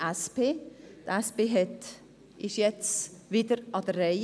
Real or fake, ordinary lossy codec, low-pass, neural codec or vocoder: real; none; none; none